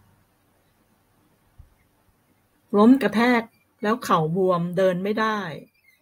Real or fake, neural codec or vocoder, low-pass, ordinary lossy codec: real; none; 19.8 kHz; AAC, 48 kbps